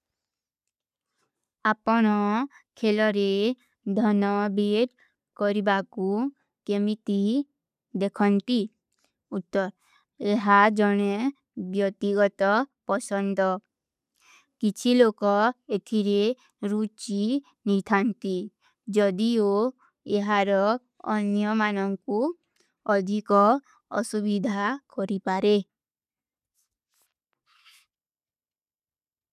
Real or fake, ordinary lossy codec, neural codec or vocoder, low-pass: real; none; none; 14.4 kHz